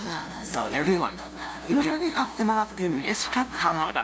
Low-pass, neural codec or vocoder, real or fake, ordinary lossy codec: none; codec, 16 kHz, 0.5 kbps, FunCodec, trained on LibriTTS, 25 frames a second; fake; none